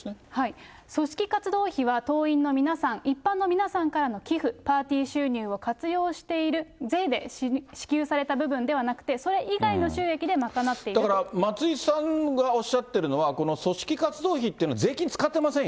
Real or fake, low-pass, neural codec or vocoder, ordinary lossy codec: real; none; none; none